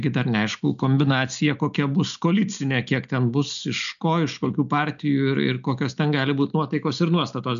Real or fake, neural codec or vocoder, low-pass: real; none; 7.2 kHz